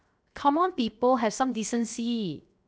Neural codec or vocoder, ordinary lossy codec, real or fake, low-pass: codec, 16 kHz, 0.7 kbps, FocalCodec; none; fake; none